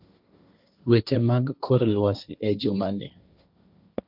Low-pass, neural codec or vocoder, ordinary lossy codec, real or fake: 5.4 kHz; codec, 16 kHz, 1.1 kbps, Voila-Tokenizer; none; fake